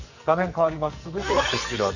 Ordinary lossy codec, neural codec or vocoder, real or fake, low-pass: none; codec, 44.1 kHz, 2.6 kbps, SNAC; fake; 7.2 kHz